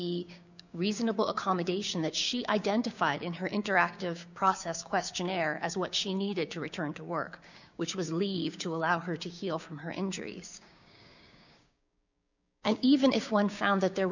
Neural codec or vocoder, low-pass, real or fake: codec, 16 kHz, 6 kbps, DAC; 7.2 kHz; fake